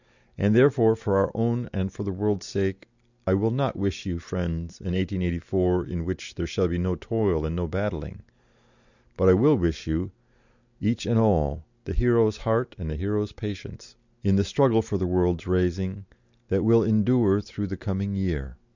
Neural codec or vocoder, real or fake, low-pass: none; real; 7.2 kHz